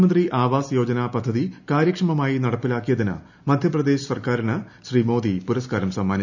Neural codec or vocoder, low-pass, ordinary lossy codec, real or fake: none; 7.2 kHz; none; real